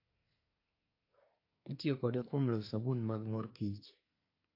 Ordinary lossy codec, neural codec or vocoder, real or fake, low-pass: AAC, 32 kbps; codec, 24 kHz, 1 kbps, SNAC; fake; 5.4 kHz